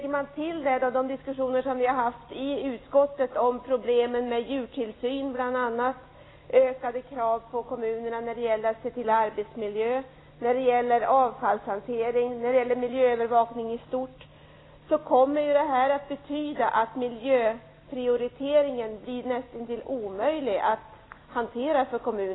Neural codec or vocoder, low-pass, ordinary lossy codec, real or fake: none; 7.2 kHz; AAC, 16 kbps; real